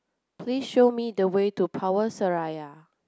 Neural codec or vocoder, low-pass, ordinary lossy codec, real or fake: none; none; none; real